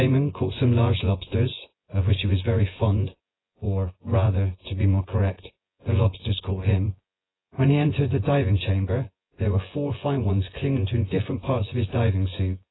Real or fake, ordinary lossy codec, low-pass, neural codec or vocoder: fake; AAC, 16 kbps; 7.2 kHz; vocoder, 24 kHz, 100 mel bands, Vocos